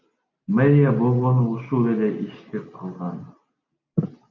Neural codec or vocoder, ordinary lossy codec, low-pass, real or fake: none; MP3, 64 kbps; 7.2 kHz; real